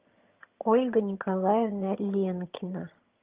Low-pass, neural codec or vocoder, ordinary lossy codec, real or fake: 3.6 kHz; vocoder, 22.05 kHz, 80 mel bands, HiFi-GAN; Opus, 32 kbps; fake